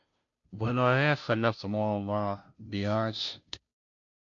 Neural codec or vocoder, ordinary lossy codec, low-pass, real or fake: codec, 16 kHz, 0.5 kbps, FunCodec, trained on Chinese and English, 25 frames a second; MP3, 48 kbps; 7.2 kHz; fake